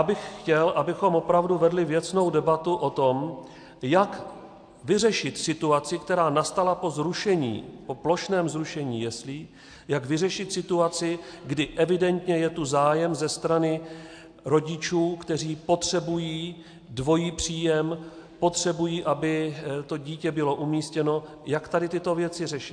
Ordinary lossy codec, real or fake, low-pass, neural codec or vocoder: AAC, 64 kbps; real; 9.9 kHz; none